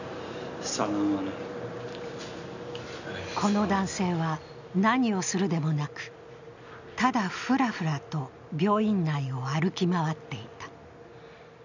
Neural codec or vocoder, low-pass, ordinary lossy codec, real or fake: none; 7.2 kHz; none; real